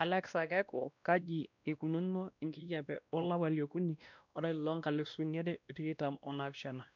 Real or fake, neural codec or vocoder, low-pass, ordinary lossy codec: fake; codec, 16 kHz, 1 kbps, X-Codec, WavLM features, trained on Multilingual LibriSpeech; 7.2 kHz; none